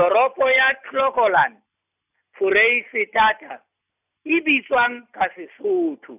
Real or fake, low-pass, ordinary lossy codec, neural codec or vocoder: real; 3.6 kHz; none; none